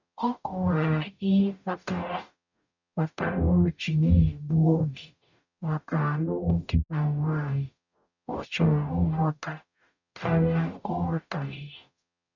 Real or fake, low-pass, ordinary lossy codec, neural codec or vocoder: fake; 7.2 kHz; none; codec, 44.1 kHz, 0.9 kbps, DAC